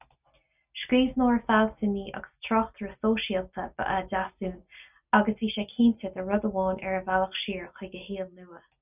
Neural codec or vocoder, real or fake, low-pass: none; real; 3.6 kHz